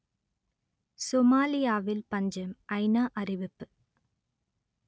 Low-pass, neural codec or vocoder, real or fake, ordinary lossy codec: none; none; real; none